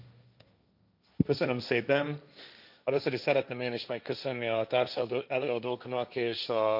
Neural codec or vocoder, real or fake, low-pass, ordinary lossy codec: codec, 16 kHz, 1.1 kbps, Voila-Tokenizer; fake; 5.4 kHz; none